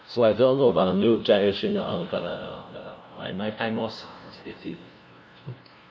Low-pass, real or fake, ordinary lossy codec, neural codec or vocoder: none; fake; none; codec, 16 kHz, 0.5 kbps, FunCodec, trained on LibriTTS, 25 frames a second